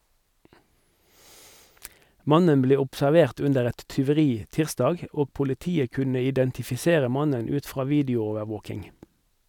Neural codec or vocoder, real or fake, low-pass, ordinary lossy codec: none; real; 19.8 kHz; none